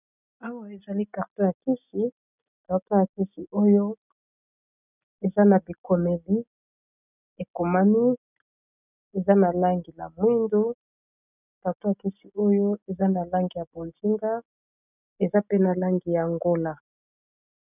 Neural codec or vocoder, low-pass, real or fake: none; 3.6 kHz; real